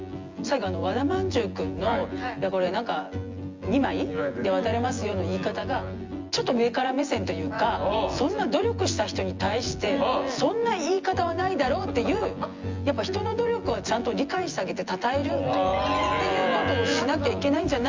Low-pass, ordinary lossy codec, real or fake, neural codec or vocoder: 7.2 kHz; Opus, 32 kbps; fake; vocoder, 24 kHz, 100 mel bands, Vocos